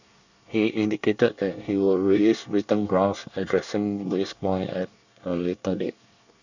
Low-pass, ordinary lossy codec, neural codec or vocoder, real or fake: 7.2 kHz; none; codec, 24 kHz, 1 kbps, SNAC; fake